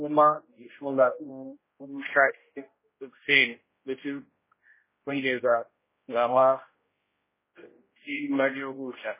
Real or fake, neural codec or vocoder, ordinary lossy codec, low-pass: fake; codec, 16 kHz, 0.5 kbps, X-Codec, HuBERT features, trained on general audio; MP3, 16 kbps; 3.6 kHz